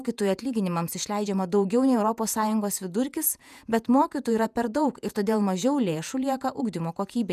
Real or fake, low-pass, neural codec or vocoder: fake; 14.4 kHz; vocoder, 48 kHz, 128 mel bands, Vocos